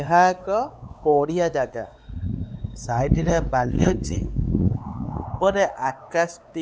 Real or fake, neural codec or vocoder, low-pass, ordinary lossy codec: fake; codec, 16 kHz, 4 kbps, X-Codec, WavLM features, trained on Multilingual LibriSpeech; none; none